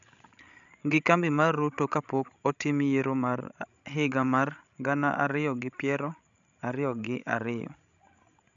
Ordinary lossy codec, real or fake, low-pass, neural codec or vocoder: none; fake; 7.2 kHz; codec, 16 kHz, 16 kbps, FreqCodec, larger model